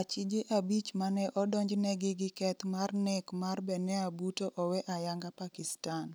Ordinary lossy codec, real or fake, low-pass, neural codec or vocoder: none; real; none; none